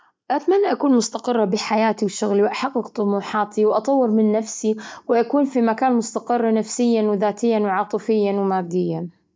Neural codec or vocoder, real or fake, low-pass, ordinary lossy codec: none; real; none; none